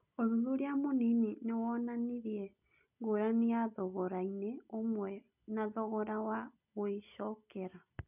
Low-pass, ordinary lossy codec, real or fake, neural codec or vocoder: 3.6 kHz; none; real; none